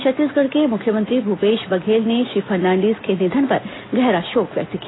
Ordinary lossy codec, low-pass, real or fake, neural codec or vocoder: AAC, 16 kbps; 7.2 kHz; real; none